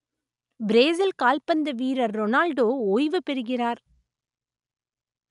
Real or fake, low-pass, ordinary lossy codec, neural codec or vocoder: real; 10.8 kHz; none; none